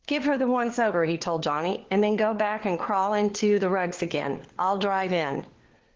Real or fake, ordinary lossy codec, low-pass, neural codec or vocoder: fake; Opus, 16 kbps; 7.2 kHz; codec, 16 kHz, 4 kbps, FunCodec, trained on LibriTTS, 50 frames a second